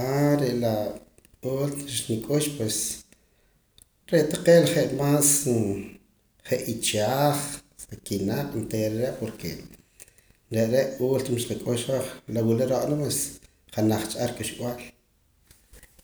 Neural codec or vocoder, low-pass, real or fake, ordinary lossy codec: none; none; real; none